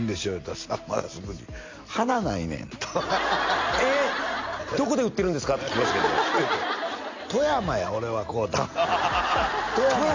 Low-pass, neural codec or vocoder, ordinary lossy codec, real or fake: 7.2 kHz; none; MP3, 48 kbps; real